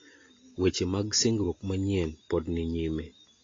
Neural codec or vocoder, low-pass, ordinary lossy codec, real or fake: none; 7.2 kHz; AAC, 32 kbps; real